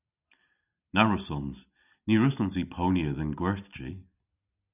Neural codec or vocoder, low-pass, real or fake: none; 3.6 kHz; real